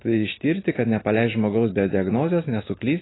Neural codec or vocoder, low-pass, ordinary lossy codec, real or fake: none; 7.2 kHz; AAC, 16 kbps; real